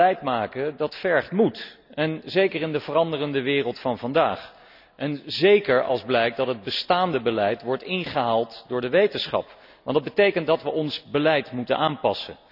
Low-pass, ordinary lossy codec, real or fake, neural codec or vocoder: 5.4 kHz; none; real; none